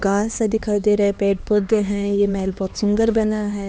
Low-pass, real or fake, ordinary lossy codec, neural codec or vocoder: none; fake; none; codec, 16 kHz, 2 kbps, X-Codec, HuBERT features, trained on LibriSpeech